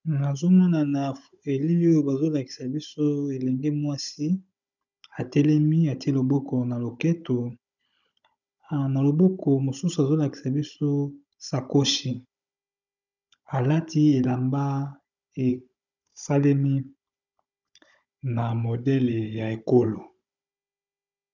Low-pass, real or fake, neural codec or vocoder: 7.2 kHz; fake; codec, 16 kHz, 16 kbps, FunCodec, trained on Chinese and English, 50 frames a second